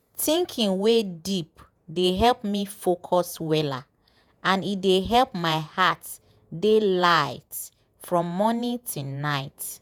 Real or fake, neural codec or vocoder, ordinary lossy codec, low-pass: fake; vocoder, 48 kHz, 128 mel bands, Vocos; none; none